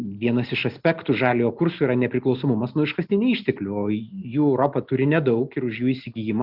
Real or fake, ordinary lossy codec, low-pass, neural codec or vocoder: real; Opus, 64 kbps; 5.4 kHz; none